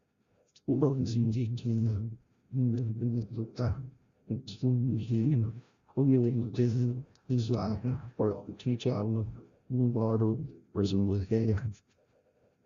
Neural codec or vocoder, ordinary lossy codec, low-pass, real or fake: codec, 16 kHz, 0.5 kbps, FreqCodec, larger model; Opus, 64 kbps; 7.2 kHz; fake